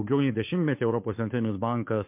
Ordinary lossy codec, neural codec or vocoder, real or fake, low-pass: MP3, 32 kbps; codec, 16 kHz, 2 kbps, FunCodec, trained on Chinese and English, 25 frames a second; fake; 3.6 kHz